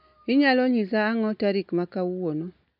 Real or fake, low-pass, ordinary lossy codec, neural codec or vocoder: real; 5.4 kHz; none; none